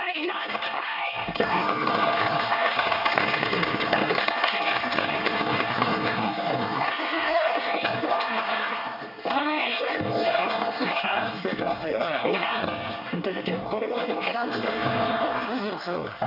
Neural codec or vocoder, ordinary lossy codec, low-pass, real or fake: codec, 24 kHz, 1 kbps, SNAC; none; 5.4 kHz; fake